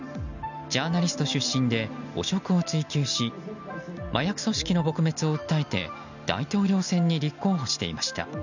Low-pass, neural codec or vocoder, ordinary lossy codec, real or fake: 7.2 kHz; none; none; real